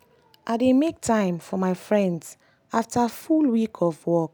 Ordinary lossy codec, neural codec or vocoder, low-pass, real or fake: none; none; none; real